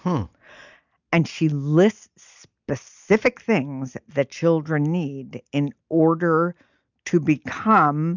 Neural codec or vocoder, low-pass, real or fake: none; 7.2 kHz; real